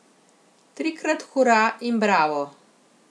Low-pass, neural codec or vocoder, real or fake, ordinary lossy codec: none; none; real; none